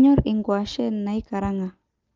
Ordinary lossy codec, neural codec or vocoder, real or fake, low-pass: Opus, 32 kbps; none; real; 7.2 kHz